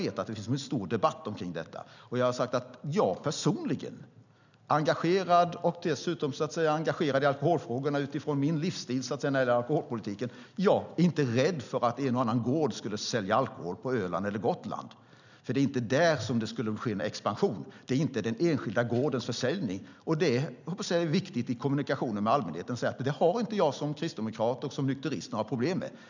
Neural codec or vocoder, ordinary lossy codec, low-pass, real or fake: none; none; 7.2 kHz; real